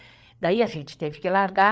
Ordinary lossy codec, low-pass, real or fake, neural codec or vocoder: none; none; fake; codec, 16 kHz, 8 kbps, FreqCodec, larger model